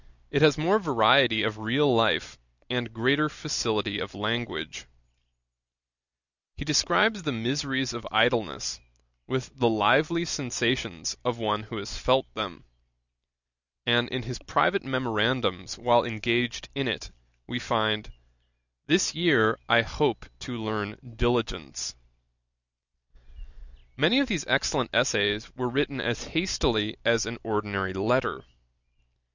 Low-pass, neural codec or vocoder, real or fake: 7.2 kHz; none; real